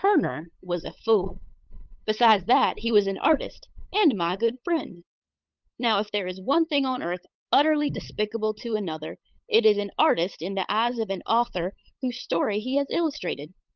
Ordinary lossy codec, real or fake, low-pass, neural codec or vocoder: Opus, 32 kbps; fake; 7.2 kHz; codec, 16 kHz, 8 kbps, FunCodec, trained on LibriTTS, 25 frames a second